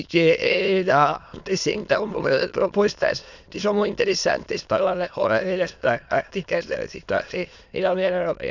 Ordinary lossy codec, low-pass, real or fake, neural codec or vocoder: none; 7.2 kHz; fake; autoencoder, 22.05 kHz, a latent of 192 numbers a frame, VITS, trained on many speakers